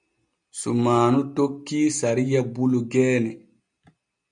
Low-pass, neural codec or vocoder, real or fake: 9.9 kHz; none; real